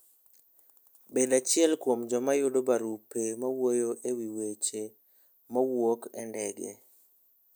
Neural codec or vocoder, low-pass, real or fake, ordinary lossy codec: none; none; real; none